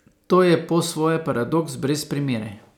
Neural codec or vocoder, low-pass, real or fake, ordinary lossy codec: none; 19.8 kHz; real; none